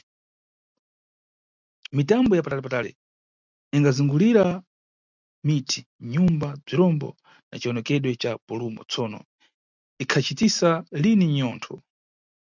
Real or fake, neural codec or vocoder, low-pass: real; none; 7.2 kHz